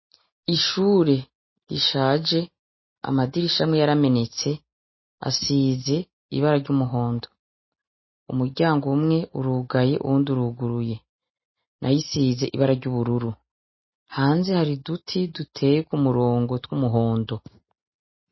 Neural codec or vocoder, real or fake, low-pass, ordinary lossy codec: none; real; 7.2 kHz; MP3, 24 kbps